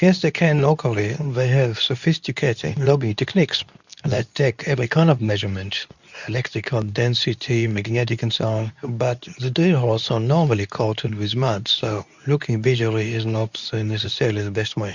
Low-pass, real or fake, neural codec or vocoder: 7.2 kHz; fake; codec, 24 kHz, 0.9 kbps, WavTokenizer, medium speech release version 2